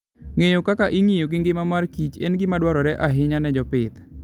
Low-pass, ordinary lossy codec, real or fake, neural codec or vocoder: 19.8 kHz; Opus, 32 kbps; real; none